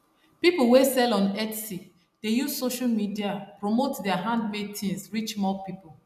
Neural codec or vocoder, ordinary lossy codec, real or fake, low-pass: none; none; real; 14.4 kHz